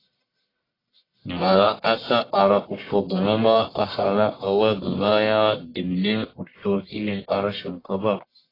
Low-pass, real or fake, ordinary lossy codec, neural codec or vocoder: 5.4 kHz; fake; AAC, 24 kbps; codec, 44.1 kHz, 1.7 kbps, Pupu-Codec